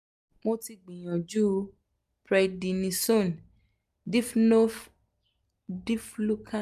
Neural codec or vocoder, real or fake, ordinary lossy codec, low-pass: none; real; MP3, 96 kbps; 14.4 kHz